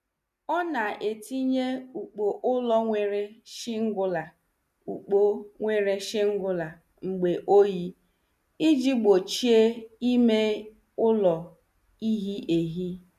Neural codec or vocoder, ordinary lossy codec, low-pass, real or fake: none; none; 14.4 kHz; real